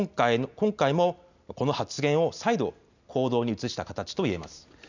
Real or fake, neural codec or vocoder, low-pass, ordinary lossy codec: real; none; 7.2 kHz; none